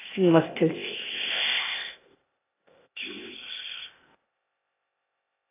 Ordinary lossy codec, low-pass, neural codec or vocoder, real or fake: AAC, 24 kbps; 3.6 kHz; codec, 16 kHz, 0.8 kbps, ZipCodec; fake